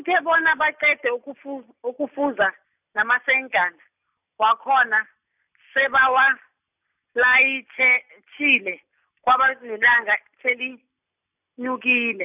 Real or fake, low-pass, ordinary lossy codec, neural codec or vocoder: real; 3.6 kHz; none; none